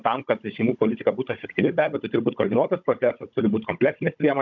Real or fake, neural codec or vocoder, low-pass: fake; codec, 16 kHz, 16 kbps, FunCodec, trained on Chinese and English, 50 frames a second; 7.2 kHz